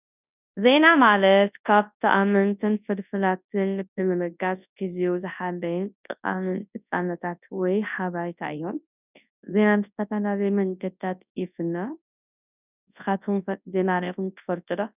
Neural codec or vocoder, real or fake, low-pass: codec, 24 kHz, 0.9 kbps, WavTokenizer, large speech release; fake; 3.6 kHz